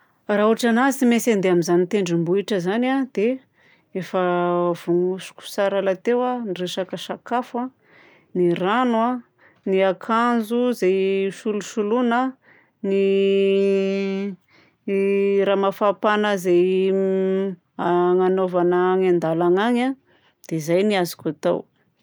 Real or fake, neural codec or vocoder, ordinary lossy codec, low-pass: real; none; none; none